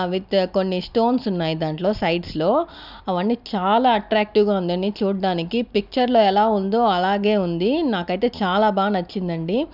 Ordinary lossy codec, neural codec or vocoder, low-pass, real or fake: none; none; 5.4 kHz; real